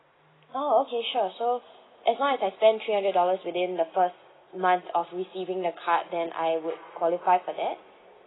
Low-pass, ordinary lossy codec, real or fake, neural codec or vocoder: 7.2 kHz; AAC, 16 kbps; real; none